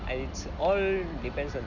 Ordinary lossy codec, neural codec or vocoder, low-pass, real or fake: none; none; 7.2 kHz; real